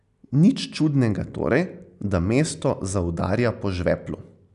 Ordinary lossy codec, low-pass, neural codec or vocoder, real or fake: none; 10.8 kHz; none; real